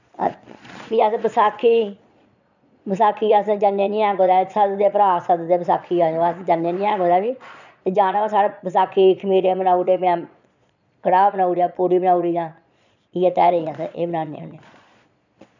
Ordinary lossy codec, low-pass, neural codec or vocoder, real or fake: none; 7.2 kHz; vocoder, 22.05 kHz, 80 mel bands, Vocos; fake